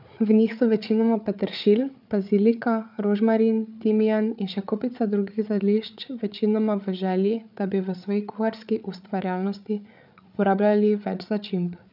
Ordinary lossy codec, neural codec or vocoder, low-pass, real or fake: none; codec, 16 kHz, 8 kbps, FreqCodec, larger model; 5.4 kHz; fake